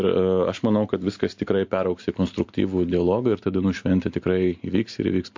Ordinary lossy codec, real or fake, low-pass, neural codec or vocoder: MP3, 48 kbps; real; 7.2 kHz; none